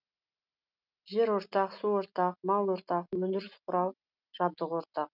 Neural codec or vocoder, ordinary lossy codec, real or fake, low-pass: none; none; real; 5.4 kHz